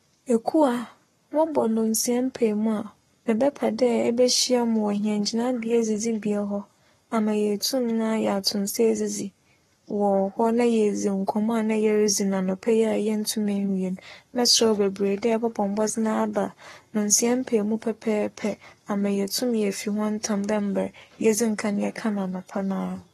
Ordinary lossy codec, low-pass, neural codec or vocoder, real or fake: AAC, 32 kbps; 19.8 kHz; codec, 44.1 kHz, 7.8 kbps, Pupu-Codec; fake